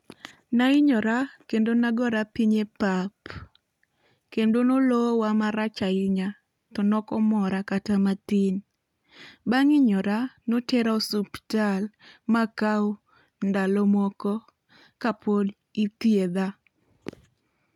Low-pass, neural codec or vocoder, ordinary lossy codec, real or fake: 19.8 kHz; none; none; real